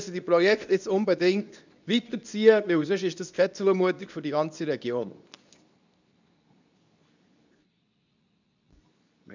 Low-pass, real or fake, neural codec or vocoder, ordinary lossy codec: 7.2 kHz; fake; codec, 24 kHz, 0.9 kbps, WavTokenizer, medium speech release version 2; none